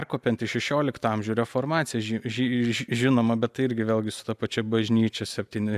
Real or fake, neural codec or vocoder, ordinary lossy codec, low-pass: real; none; AAC, 96 kbps; 14.4 kHz